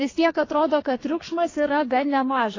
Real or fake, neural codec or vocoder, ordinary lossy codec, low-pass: fake; codec, 44.1 kHz, 2.6 kbps, SNAC; AAC, 32 kbps; 7.2 kHz